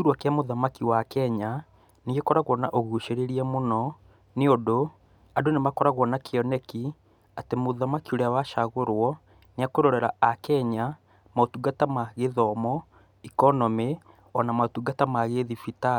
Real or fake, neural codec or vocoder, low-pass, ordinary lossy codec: real; none; 19.8 kHz; none